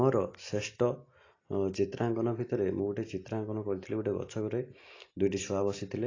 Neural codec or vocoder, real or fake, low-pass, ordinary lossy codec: none; real; 7.2 kHz; AAC, 32 kbps